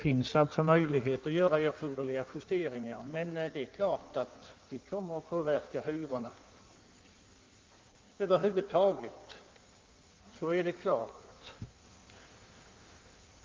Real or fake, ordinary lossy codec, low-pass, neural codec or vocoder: fake; Opus, 24 kbps; 7.2 kHz; codec, 16 kHz in and 24 kHz out, 1.1 kbps, FireRedTTS-2 codec